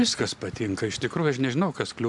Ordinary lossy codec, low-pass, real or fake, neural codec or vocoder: MP3, 96 kbps; 10.8 kHz; real; none